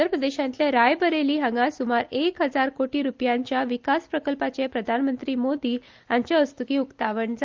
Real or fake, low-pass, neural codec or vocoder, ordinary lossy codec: real; 7.2 kHz; none; Opus, 32 kbps